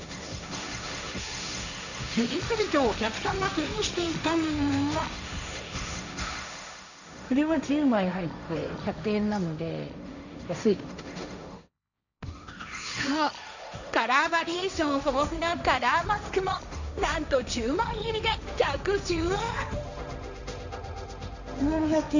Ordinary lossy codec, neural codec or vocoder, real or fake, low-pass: none; codec, 16 kHz, 1.1 kbps, Voila-Tokenizer; fake; 7.2 kHz